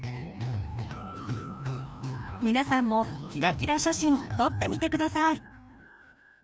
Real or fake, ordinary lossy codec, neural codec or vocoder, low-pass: fake; none; codec, 16 kHz, 1 kbps, FreqCodec, larger model; none